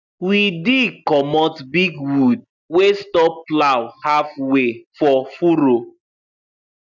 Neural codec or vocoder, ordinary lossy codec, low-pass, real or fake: none; none; 7.2 kHz; real